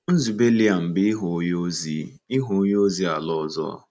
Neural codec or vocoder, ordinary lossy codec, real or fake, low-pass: none; none; real; none